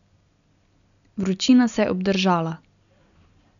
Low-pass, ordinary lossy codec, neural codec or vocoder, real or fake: 7.2 kHz; none; none; real